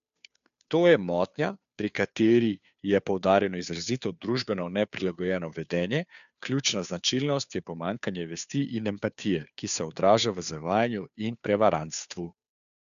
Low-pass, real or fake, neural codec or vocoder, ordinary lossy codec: 7.2 kHz; fake; codec, 16 kHz, 2 kbps, FunCodec, trained on Chinese and English, 25 frames a second; none